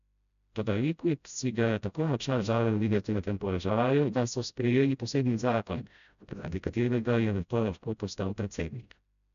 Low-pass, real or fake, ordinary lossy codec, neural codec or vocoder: 7.2 kHz; fake; none; codec, 16 kHz, 0.5 kbps, FreqCodec, smaller model